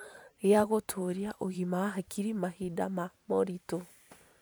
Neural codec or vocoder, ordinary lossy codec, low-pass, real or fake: vocoder, 44.1 kHz, 128 mel bands every 512 samples, BigVGAN v2; none; none; fake